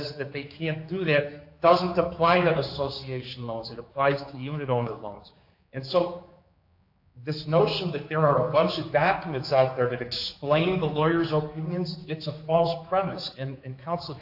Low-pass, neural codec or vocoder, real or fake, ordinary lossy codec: 5.4 kHz; codec, 16 kHz, 4 kbps, X-Codec, HuBERT features, trained on general audio; fake; AAC, 48 kbps